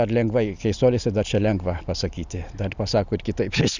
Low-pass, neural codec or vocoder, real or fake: 7.2 kHz; none; real